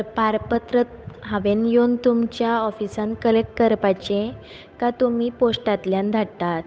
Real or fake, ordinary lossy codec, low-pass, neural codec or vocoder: fake; none; none; codec, 16 kHz, 8 kbps, FunCodec, trained on Chinese and English, 25 frames a second